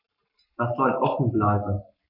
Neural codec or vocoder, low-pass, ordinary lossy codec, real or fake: codec, 44.1 kHz, 7.8 kbps, Pupu-Codec; 5.4 kHz; none; fake